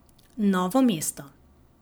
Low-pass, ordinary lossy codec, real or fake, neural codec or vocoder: none; none; real; none